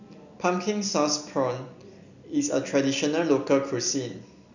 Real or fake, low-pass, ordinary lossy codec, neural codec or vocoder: real; 7.2 kHz; none; none